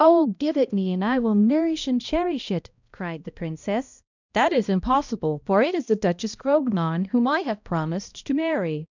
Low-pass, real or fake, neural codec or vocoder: 7.2 kHz; fake; codec, 16 kHz, 1 kbps, X-Codec, HuBERT features, trained on balanced general audio